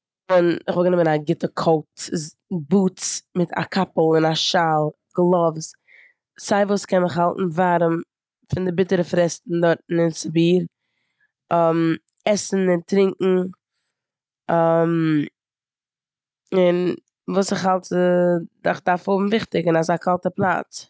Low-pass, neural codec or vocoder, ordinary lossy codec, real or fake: none; none; none; real